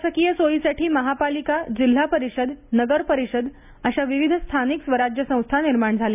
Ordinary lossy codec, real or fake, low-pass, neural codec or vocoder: none; real; 3.6 kHz; none